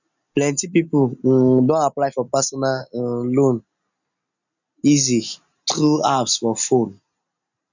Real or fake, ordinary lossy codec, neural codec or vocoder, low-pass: real; none; none; 7.2 kHz